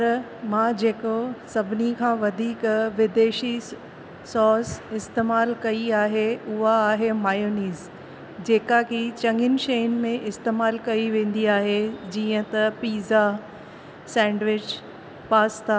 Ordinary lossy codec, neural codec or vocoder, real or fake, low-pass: none; none; real; none